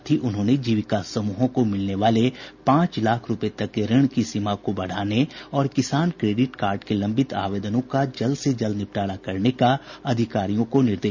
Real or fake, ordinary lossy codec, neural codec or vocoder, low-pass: real; none; none; 7.2 kHz